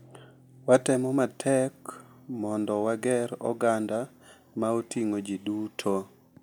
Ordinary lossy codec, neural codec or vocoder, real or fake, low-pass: none; none; real; none